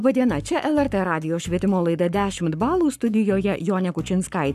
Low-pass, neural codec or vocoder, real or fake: 14.4 kHz; codec, 44.1 kHz, 7.8 kbps, Pupu-Codec; fake